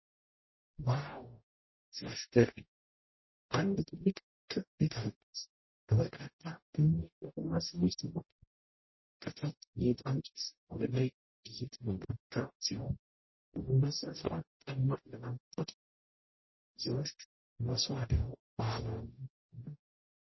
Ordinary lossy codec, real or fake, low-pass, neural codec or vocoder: MP3, 24 kbps; fake; 7.2 kHz; codec, 44.1 kHz, 0.9 kbps, DAC